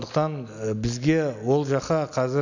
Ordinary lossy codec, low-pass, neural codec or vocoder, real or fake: none; 7.2 kHz; none; real